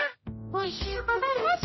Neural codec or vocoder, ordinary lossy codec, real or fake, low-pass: codec, 16 kHz, 0.5 kbps, X-Codec, HuBERT features, trained on general audio; MP3, 24 kbps; fake; 7.2 kHz